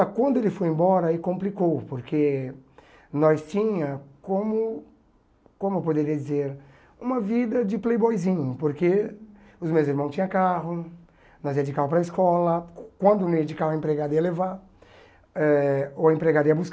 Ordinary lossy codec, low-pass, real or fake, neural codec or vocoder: none; none; real; none